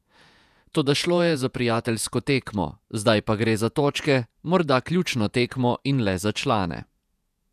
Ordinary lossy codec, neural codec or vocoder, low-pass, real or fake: none; vocoder, 48 kHz, 128 mel bands, Vocos; 14.4 kHz; fake